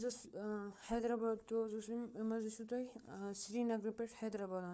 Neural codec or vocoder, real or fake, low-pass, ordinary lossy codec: codec, 16 kHz, 4 kbps, FunCodec, trained on Chinese and English, 50 frames a second; fake; none; none